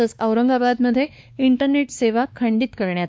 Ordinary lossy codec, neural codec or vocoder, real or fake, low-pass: none; codec, 16 kHz, 2 kbps, X-Codec, WavLM features, trained on Multilingual LibriSpeech; fake; none